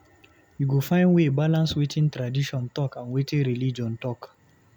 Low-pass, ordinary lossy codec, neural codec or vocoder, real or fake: 19.8 kHz; none; none; real